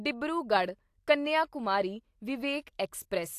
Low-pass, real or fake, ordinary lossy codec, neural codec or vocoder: 14.4 kHz; real; AAC, 64 kbps; none